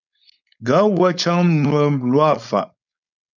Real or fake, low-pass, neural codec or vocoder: fake; 7.2 kHz; codec, 16 kHz, 4.8 kbps, FACodec